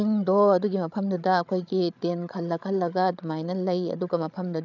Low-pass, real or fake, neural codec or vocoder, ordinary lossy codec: 7.2 kHz; fake; codec, 16 kHz, 8 kbps, FreqCodec, larger model; none